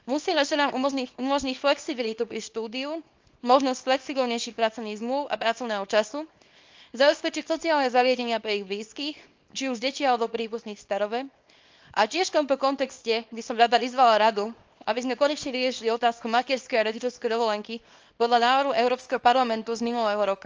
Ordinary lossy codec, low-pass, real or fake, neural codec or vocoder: Opus, 24 kbps; 7.2 kHz; fake; codec, 24 kHz, 0.9 kbps, WavTokenizer, small release